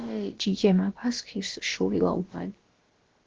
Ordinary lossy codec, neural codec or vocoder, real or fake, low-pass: Opus, 16 kbps; codec, 16 kHz, about 1 kbps, DyCAST, with the encoder's durations; fake; 7.2 kHz